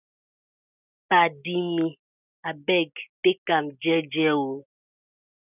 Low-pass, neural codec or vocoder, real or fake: 3.6 kHz; none; real